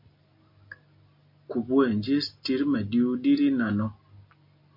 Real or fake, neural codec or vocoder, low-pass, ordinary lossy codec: real; none; 5.4 kHz; MP3, 32 kbps